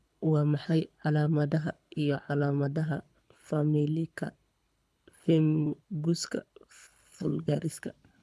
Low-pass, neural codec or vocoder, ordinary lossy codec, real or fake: none; codec, 24 kHz, 6 kbps, HILCodec; none; fake